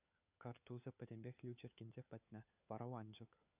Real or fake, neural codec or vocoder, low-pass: fake; codec, 16 kHz, 4 kbps, FunCodec, trained on LibriTTS, 50 frames a second; 3.6 kHz